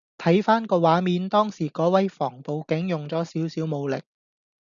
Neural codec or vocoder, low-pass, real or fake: none; 7.2 kHz; real